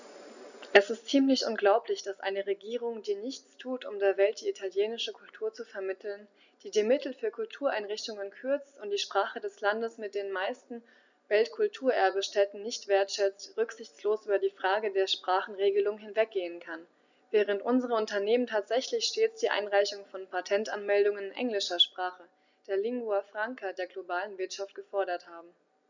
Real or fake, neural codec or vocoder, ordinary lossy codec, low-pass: real; none; none; 7.2 kHz